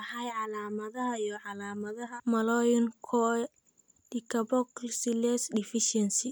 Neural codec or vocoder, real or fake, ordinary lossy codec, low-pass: none; real; none; none